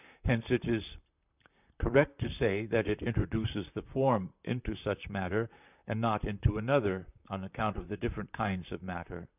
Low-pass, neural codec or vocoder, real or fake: 3.6 kHz; vocoder, 44.1 kHz, 128 mel bands, Pupu-Vocoder; fake